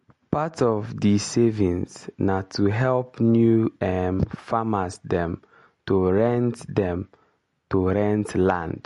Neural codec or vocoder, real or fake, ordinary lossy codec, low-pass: none; real; MP3, 48 kbps; 14.4 kHz